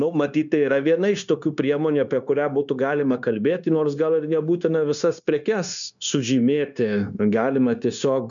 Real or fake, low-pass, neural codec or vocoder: fake; 7.2 kHz; codec, 16 kHz, 0.9 kbps, LongCat-Audio-Codec